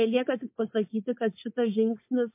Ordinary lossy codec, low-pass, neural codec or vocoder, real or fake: MP3, 24 kbps; 3.6 kHz; codec, 16 kHz, 4.8 kbps, FACodec; fake